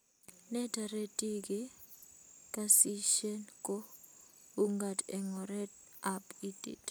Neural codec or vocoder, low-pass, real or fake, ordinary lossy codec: none; none; real; none